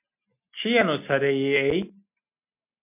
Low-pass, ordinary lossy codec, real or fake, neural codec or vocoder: 3.6 kHz; MP3, 32 kbps; real; none